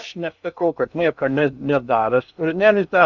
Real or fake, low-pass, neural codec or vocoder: fake; 7.2 kHz; codec, 16 kHz in and 24 kHz out, 0.6 kbps, FocalCodec, streaming, 2048 codes